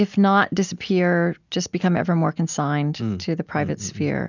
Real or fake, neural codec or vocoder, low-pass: real; none; 7.2 kHz